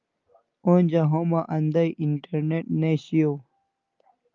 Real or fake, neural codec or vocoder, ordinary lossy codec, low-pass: real; none; Opus, 32 kbps; 7.2 kHz